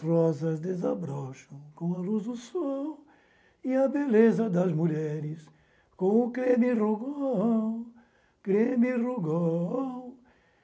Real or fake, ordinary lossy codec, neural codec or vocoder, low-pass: real; none; none; none